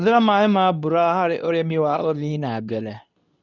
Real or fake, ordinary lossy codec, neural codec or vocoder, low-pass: fake; none; codec, 24 kHz, 0.9 kbps, WavTokenizer, medium speech release version 2; 7.2 kHz